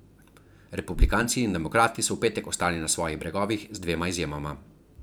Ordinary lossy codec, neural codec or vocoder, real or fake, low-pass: none; vocoder, 44.1 kHz, 128 mel bands every 512 samples, BigVGAN v2; fake; none